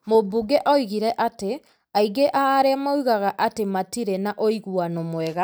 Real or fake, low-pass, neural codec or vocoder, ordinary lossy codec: real; none; none; none